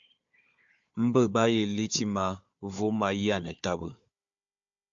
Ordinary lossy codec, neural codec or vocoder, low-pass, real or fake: AAC, 64 kbps; codec, 16 kHz, 4 kbps, FunCodec, trained on Chinese and English, 50 frames a second; 7.2 kHz; fake